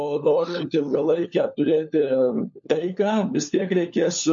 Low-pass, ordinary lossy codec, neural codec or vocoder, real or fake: 7.2 kHz; MP3, 48 kbps; codec, 16 kHz, 4 kbps, FunCodec, trained on LibriTTS, 50 frames a second; fake